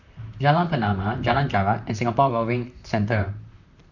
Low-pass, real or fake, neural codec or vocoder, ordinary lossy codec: 7.2 kHz; fake; vocoder, 44.1 kHz, 128 mel bands, Pupu-Vocoder; none